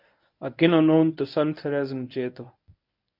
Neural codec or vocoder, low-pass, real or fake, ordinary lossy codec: codec, 24 kHz, 0.9 kbps, WavTokenizer, medium speech release version 1; 5.4 kHz; fake; MP3, 48 kbps